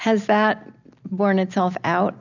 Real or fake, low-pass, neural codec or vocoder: real; 7.2 kHz; none